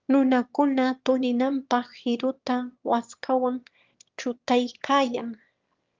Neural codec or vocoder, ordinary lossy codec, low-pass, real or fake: autoencoder, 22.05 kHz, a latent of 192 numbers a frame, VITS, trained on one speaker; Opus, 24 kbps; 7.2 kHz; fake